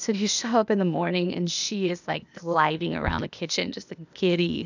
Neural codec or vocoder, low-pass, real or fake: codec, 16 kHz, 0.8 kbps, ZipCodec; 7.2 kHz; fake